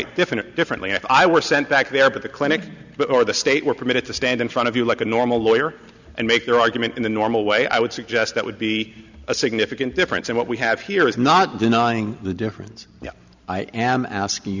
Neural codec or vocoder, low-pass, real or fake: none; 7.2 kHz; real